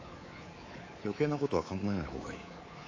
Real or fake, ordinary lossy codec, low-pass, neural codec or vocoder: fake; AAC, 32 kbps; 7.2 kHz; codec, 24 kHz, 3.1 kbps, DualCodec